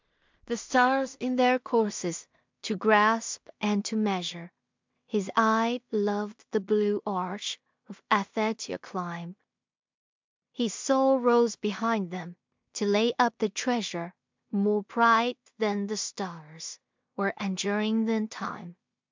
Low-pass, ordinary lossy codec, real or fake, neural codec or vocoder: 7.2 kHz; MP3, 64 kbps; fake; codec, 16 kHz in and 24 kHz out, 0.4 kbps, LongCat-Audio-Codec, two codebook decoder